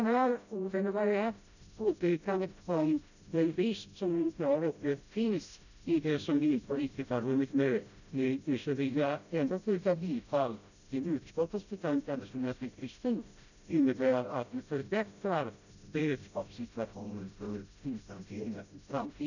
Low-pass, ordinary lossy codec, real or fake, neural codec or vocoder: 7.2 kHz; none; fake; codec, 16 kHz, 0.5 kbps, FreqCodec, smaller model